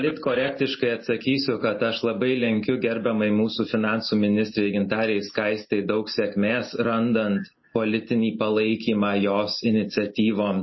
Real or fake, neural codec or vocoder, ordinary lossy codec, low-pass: real; none; MP3, 24 kbps; 7.2 kHz